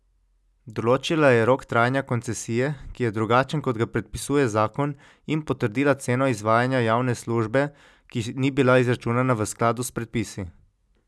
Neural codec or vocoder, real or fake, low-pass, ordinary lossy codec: none; real; none; none